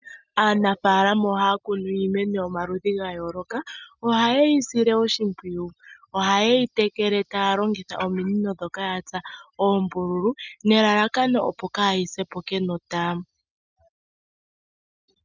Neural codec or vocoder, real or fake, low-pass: none; real; 7.2 kHz